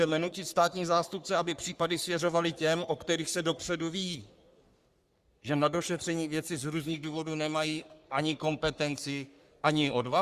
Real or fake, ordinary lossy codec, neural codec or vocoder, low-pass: fake; Opus, 64 kbps; codec, 44.1 kHz, 3.4 kbps, Pupu-Codec; 14.4 kHz